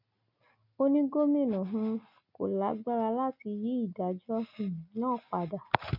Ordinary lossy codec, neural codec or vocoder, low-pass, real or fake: none; none; 5.4 kHz; real